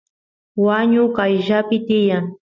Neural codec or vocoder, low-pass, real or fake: none; 7.2 kHz; real